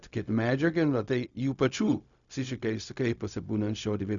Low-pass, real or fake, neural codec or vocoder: 7.2 kHz; fake; codec, 16 kHz, 0.4 kbps, LongCat-Audio-Codec